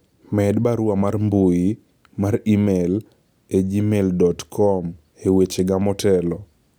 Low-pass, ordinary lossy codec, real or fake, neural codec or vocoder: none; none; real; none